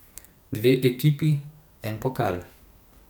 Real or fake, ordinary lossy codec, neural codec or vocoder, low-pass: fake; none; codec, 44.1 kHz, 2.6 kbps, SNAC; none